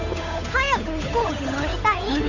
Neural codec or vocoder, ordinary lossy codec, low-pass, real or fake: codec, 16 kHz, 8 kbps, FunCodec, trained on Chinese and English, 25 frames a second; none; 7.2 kHz; fake